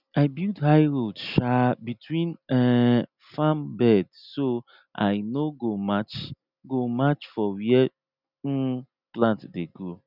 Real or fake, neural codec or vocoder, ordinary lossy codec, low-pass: real; none; none; 5.4 kHz